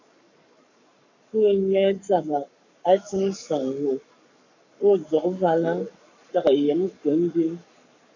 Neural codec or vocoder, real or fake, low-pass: codec, 44.1 kHz, 7.8 kbps, Pupu-Codec; fake; 7.2 kHz